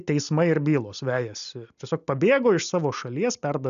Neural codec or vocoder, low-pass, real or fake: none; 7.2 kHz; real